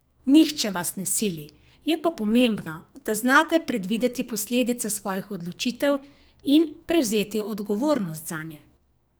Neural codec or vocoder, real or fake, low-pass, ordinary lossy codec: codec, 44.1 kHz, 2.6 kbps, SNAC; fake; none; none